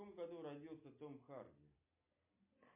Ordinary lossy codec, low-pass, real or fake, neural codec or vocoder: MP3, 32 kbps; 3.6 kHz; real; none